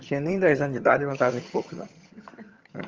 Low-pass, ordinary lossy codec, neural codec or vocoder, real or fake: 7.2 kHz; Opus, 24 kbps; vocoder, 22.05 kHz, 80 mel bands, HiFi-GAN; fake